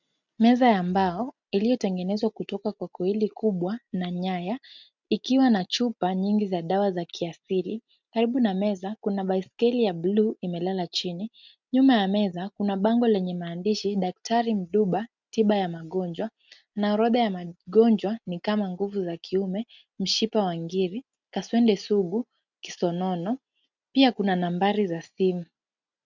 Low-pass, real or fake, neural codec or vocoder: 7.2 kHz; real; none